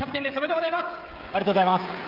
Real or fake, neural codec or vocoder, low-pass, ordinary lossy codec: fake; codec, 16 kHz, 16 kbps, FreqCodec, smaller model; 5.4 kHz; Opus, 24 kbps